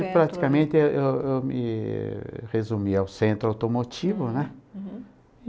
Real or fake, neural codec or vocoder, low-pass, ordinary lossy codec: real; none; none; none